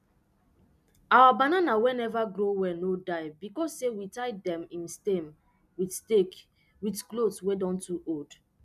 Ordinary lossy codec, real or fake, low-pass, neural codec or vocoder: none; real; 14.4 kHz; none